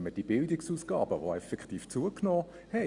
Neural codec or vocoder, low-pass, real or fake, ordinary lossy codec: none; 10.8 kHz; real; Opus, 64 kbps